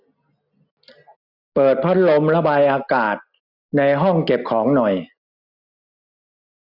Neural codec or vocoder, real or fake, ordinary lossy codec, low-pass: none; real; none; 5.4 kHz